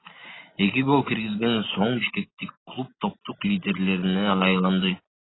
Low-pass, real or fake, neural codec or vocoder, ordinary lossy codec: 7.2 kHz; real; none; AAC, 16 kbps